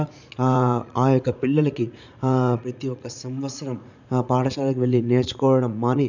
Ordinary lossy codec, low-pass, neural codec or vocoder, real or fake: none; 7.2 kHz; vocoder, 44.1 kHz, 80 mel bands, Vocos; fake